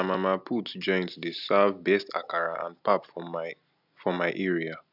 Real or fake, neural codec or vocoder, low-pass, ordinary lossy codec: real; none; 5.4 kHz; none